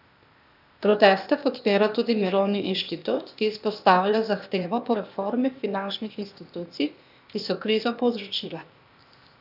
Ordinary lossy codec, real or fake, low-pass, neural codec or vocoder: none; fake; 5.4 kHz; codec, 16 kHz, 0.8 kbps, ZipCodec